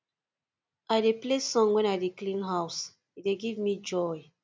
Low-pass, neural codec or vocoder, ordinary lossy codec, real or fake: none; none; none; real